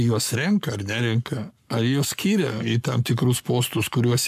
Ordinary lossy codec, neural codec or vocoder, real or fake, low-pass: MP3, 96 kbps; codec, 44.1 kHz, 7.8 kbps, Pupu-Codec; fake; 14.4 kHz